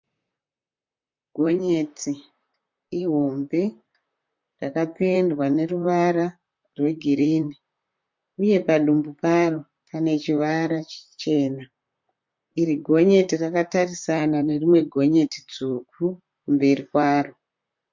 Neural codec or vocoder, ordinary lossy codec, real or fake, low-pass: vocoder, 44.1 kHz, 128 mel bands, Pupu-Vocoder; MP3, 48 kbps; fake; 7.2 kHz